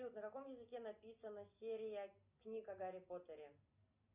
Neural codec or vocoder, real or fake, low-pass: none; real; 3.6 kHz